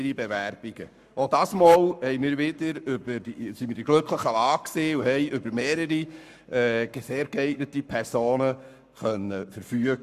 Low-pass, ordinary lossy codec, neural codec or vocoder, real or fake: 14.4 kHz; none; codec, 44.1 kHz, 7.8 kbps, Pupu-Codec; fake